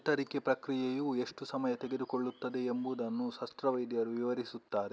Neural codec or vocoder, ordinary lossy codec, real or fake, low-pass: none; none; real; none